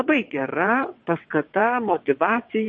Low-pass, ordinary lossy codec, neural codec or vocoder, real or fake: 9.9 kHz; MP3, 32 kbps; vocoder, 22.05 kHz, 80 mel bands, WaveNeXt; fake